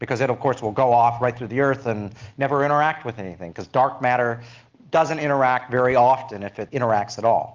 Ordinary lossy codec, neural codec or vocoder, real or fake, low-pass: Opus, 32 kbps; none; real; 7.2 kHz